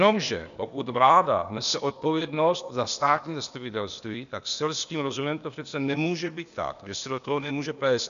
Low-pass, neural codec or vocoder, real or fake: 7.2 kHz; codec, 16 kHz, 0.8 kbps, ZipCodec; fake